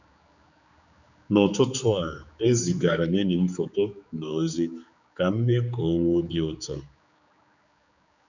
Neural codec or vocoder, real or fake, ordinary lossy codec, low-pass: codec, 16 kHz, 4 kbps, X-Codec, HuBERT features, trained on general audio; fake; none; 7.2 kHz